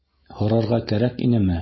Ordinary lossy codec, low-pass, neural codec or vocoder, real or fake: MP3, 24 kbps; 7.2 kHz; none; real